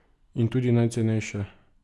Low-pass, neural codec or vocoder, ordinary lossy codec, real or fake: none; none; none; real